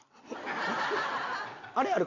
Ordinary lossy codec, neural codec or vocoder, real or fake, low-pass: none; none; real; 7.2 kHz